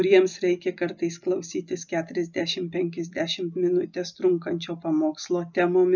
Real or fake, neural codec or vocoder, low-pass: real; none; 7.2 kHz